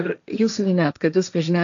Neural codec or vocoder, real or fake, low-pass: codec, 16 kHz, 1.1 kbps, Voila-Tokenizer; fake; 7.2 kHz